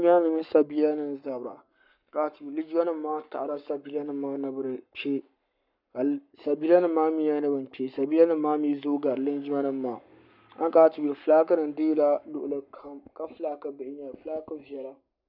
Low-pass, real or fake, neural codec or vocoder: 5.4 kHz; fake; codec, 44.1 kHz, 7.8 kbps, Pupu-Codec